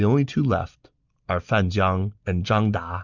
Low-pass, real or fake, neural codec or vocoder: 7.2 kHz; real; none